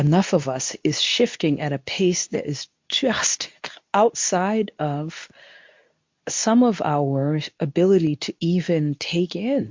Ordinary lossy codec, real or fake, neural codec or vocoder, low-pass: MP3, 48 kbps; fake; codec, 24 kHz, 0.9 kbps, WavTokenizer, medium speech release version 2; 7.2 kHz